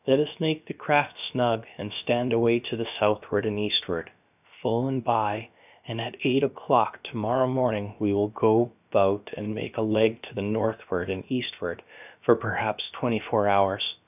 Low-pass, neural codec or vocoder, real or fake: 3.6 kHz; codec, 16 kHz, about 1 kbps, DyCAST, with the encoder's durations; fake